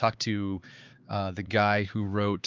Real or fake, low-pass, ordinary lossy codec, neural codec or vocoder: fake; 7.2 kHz; Opus, 24 kbps; codec, 16 kHz, 4 kbps, X-Codec, HuBERT features, trained on LibriSpeech